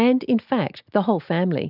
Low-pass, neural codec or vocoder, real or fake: 5.4 kHz; none; real